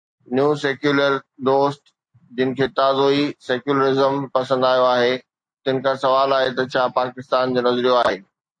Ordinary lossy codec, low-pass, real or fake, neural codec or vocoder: AAC, 64 kbps; 9.9 kHz; real; none